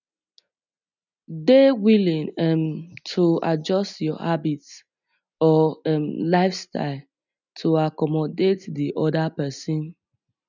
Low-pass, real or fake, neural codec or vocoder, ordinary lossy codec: 7.2 kHz; real; none; none